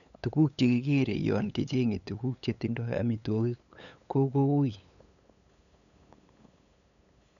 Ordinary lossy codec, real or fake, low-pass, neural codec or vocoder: none; fake; 7.2 kHz; codec, 16 kHz, 8 kbps, FunCodec, trained on LibriTTS, 25 frames a second